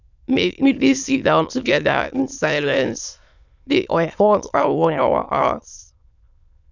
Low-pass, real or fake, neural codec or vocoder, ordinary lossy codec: 7.2 kHz; fake; autoencoder, 22.05 kHz, a latent of 192 numbers a frame, VITS, trained on many speakers; none